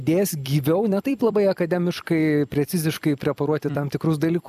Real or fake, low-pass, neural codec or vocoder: fake; 14.4 kHz; vocoder, 44.1 kHz, 128 mel bands every 256 samples, BigVGAN v2